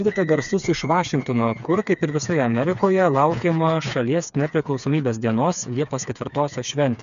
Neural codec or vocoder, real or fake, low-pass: codec, 16 kHz, 4 kbps, FreqCodec, smaller model; fake; 7.2 kHz